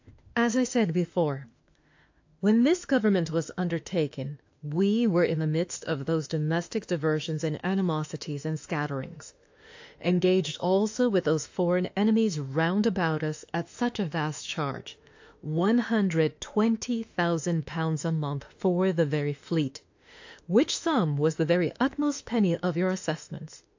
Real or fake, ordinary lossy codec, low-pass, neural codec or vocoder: fake; AAC, 48 kbps; 7.2 kHz; autoencoder, 48 kHz, 32 numbers a frame, DAC-VAE, trained on Japanese speech